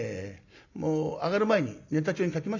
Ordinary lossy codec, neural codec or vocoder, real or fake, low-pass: MP3, 64 kbps; none; real; 7.2 kHz